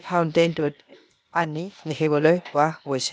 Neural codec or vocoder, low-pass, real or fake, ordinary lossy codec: codec, 16 kHz, 0.8 kbps, ZipCodec; none; fake; none